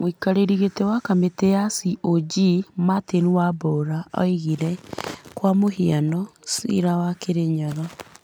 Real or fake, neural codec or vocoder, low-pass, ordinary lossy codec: real; none; none; none